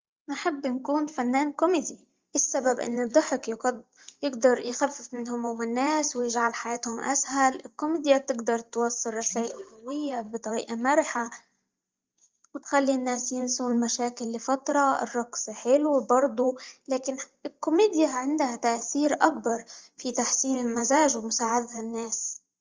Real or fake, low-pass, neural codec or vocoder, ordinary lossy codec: fake; 7.2 kHz; vocoder, 44.1 kHz, 128 mel bands every 512 samples, BigVGAN v2; Opus, 32 kbps